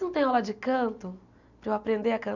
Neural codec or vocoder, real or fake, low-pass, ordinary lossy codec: none; real; 7.2 kHz; none